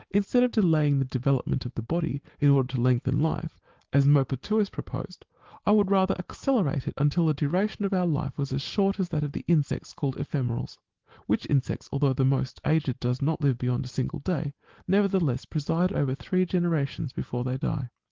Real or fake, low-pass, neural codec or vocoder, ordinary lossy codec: real; 7.2 kHz; none; Opus, 16 kbps